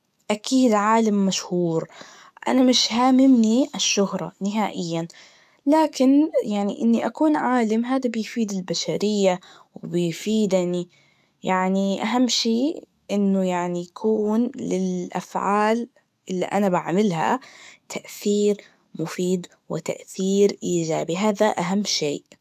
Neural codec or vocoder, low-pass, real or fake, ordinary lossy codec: codec, 44.1 kHz, 7.8 kbps, DAC; 14.4 kHz; fake; none